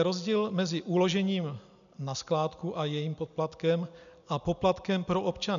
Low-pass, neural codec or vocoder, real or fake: 7.2 kHz; none; real